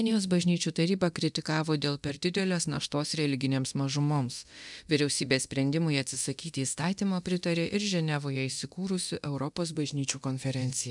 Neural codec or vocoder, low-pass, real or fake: codec, 24 kHz, 0.9 kbps, DualCodec; 10.8 kHz; fake